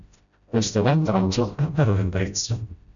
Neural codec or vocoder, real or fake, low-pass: codec, 16 kHz, 0.5 kbps, FreqCodec, smaller model; fake; 7.2 kHz